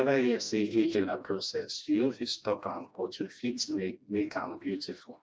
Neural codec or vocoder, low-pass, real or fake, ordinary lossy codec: codec, 16 kHz, 1 kbps, FreqCodec, smaller model; none; fake; none